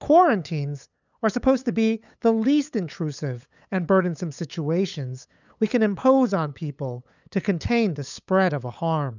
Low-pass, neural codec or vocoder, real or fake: 7.2 kHz; codec, 16 kHz, 8 kbps, FunCodec, trained on LibriTTS, 25 frames a second; fake